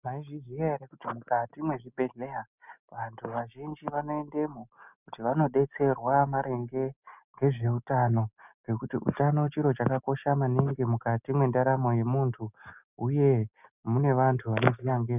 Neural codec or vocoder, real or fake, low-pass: none; real; 3.6 kHz